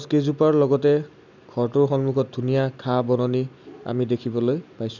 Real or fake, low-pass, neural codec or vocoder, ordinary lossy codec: real; 7.2 kHz; none; none